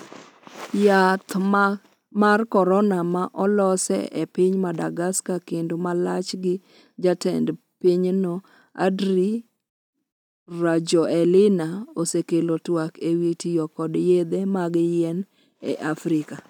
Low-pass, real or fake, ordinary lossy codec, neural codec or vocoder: 19.8 kHz; real; none; none